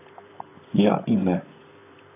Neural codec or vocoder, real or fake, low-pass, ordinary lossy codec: vocoder, 44.1 kHz, 128 mel bands, Pupu-Vocoder; fake; 3.6 kHz; AAC, 24 kbps